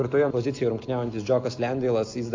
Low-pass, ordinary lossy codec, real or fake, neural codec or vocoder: 7.2 kHz; AAC, 48 kbps; real; none